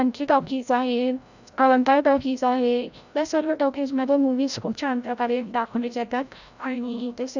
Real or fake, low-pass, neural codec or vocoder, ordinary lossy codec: fake; 7.2 kHz; codec, 16 kHz, 0.5 kbps, FreqCodec, larger model; none